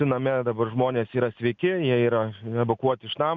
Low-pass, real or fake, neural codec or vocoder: 7.2 kHz; real; none